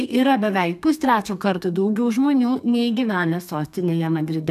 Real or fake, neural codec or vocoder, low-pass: fake; codec, 32 kHz, 1.9 kbps, SNAC; 14.4 kHz